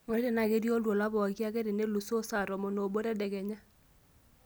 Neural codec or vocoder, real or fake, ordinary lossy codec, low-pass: none; real; none; none